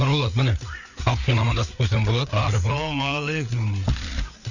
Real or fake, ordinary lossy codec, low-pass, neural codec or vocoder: fake; none; 7.2 kHz; codec, 16 kHz, 4 kbps, FreqCodec, larger model